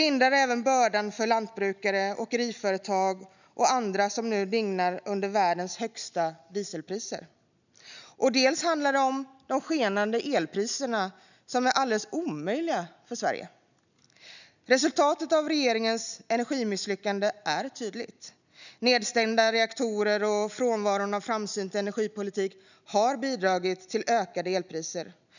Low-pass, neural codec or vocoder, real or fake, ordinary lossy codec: 7.2 kHz; none; real; none